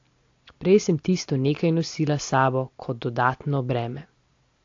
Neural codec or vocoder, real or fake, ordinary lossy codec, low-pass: none; real; AAC, 48 kbps; 7.2 kHz